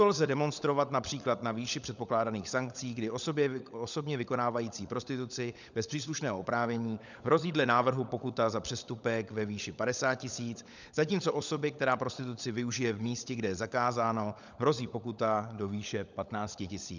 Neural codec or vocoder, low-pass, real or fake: codec, 16 kHz, 16 kbps, FunCodec, trained on LibriTTS, 50 frames a second; 7.2 kHz; fake